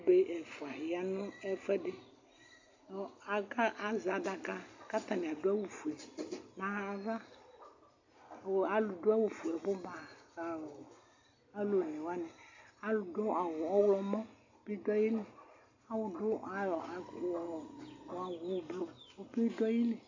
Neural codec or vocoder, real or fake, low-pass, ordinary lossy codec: none; real; 7.2 kHz; AAC, 48 kbps